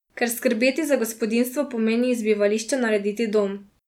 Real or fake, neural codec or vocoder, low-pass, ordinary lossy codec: real; none; 19.8 kHz; none